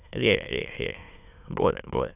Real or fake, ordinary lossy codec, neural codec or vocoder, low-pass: fake; none; autoencoder, 22.05 kHz, a latent of 192 numbers a frame, VITS, trained on many speakers; 3.6 kHz